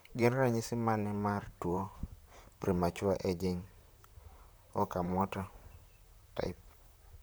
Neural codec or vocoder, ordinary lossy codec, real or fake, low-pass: vocoder, 44.1 kHz, 128 mel bands, Pupu-Vocoder; none; fake; none